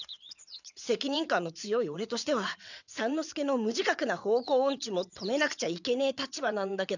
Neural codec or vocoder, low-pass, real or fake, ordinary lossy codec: vocoder, 22.05 kHz, 80 mel bands, WaveNeXt; 7.2 kHz; fake; none